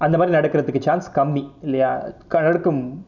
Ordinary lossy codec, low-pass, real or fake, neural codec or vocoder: none; 7.2 kHz; real; none